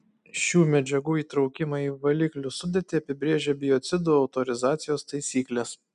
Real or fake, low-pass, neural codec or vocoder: real; 10.8 kHz; none